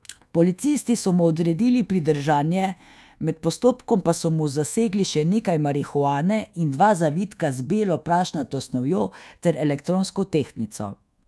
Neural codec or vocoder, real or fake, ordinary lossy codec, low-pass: codec, 24 kHz, 1.2 kbps, DualCodec; fake; none; none